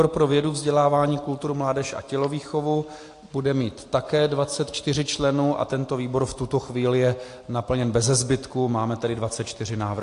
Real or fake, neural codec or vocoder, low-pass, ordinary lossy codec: real; none; 10.8 kHz; AAC, 48 kbps